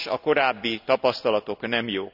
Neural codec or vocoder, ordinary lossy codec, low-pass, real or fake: none; none; 5.4 kHz; real